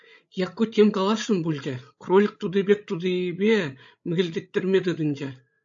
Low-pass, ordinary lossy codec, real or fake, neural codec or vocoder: 7.2 kHz; AAC, 64 kbps; fake; codec, 16 kHz, 16 kbps, FreqCodec, larger model